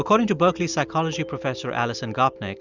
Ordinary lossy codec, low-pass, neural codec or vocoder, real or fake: Opus, 64 kbps; 7.2 kHz; none; real